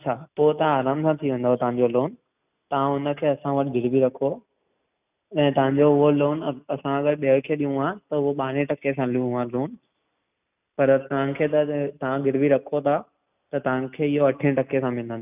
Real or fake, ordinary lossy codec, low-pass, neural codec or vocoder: real; none; 3.6 kHz; none